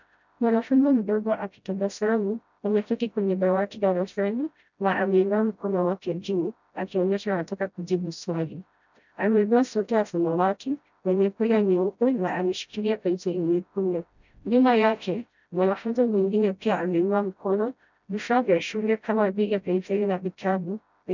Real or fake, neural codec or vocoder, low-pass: fake; codec, 16 kHz, 0.5 kbps, FreqCodec, smaller model; 7.2 kHz